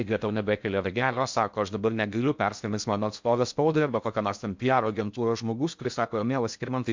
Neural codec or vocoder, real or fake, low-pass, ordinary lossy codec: codec, 16 kHz in and 24 kHz out, 0.6 kbps, FocalCodec, streaming, 4096 codes; fake; 7.2 kHz; MP3, 64 kbps